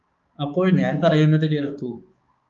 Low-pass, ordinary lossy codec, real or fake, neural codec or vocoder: 7.2 kHz; Opus, 32 kbps; fake; codec, 16 kHz, 4 kbps, X-Codec, HuBERT features, trained on balanced general audio